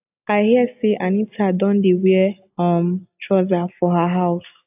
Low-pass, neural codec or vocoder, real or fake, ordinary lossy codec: 3.6 kHz; none; real; AAC, 32 kbps